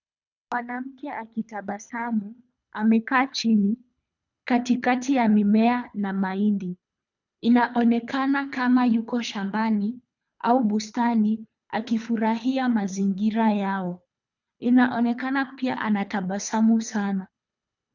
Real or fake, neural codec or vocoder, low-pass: fake; codec, 24 kHz, 3 kbps, HILCodec; 7.2 kHz